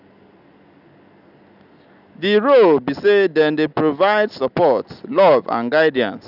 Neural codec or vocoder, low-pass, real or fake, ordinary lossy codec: none; 5.4 kHz; real; none